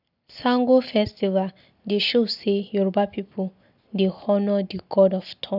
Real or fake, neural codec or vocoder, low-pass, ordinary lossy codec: real; none; 5.4 kHz; none